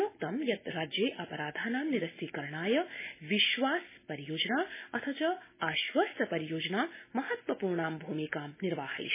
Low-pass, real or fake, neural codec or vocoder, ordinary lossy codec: 3.6 kHz; real; none; MP3, 16 kbps